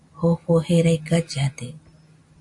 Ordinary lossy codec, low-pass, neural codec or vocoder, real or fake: MP3, 64 kbps; 10.8 kHz; none; real